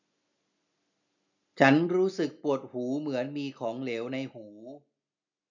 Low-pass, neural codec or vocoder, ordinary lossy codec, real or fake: 7.2 kHz; none; none; real